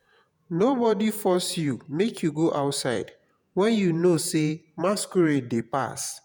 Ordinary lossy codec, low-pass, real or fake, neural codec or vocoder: none; none; fake; vocoder, 48 kHz, 128 mel bands, Vocos